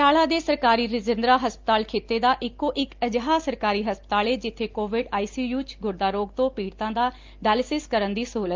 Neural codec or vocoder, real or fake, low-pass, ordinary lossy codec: none; real; 7.2 kHz; Opus, 32 kbps